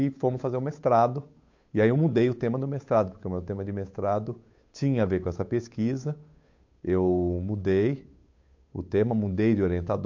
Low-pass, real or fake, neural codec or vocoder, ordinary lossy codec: 7.2 kHz; fake; codec, 16 kHz, 8 kbps, FunCodec, trained on Chinese and English, 25 frames a second; MP3, 48 kbps